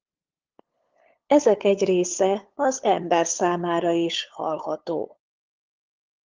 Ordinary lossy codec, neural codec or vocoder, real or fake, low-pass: Opus, 32 kbps; codec, 16 kHz, 8 kbps, FunCodec, trained on LibriTTS, 25 frames a second; fake; 7.2 kHz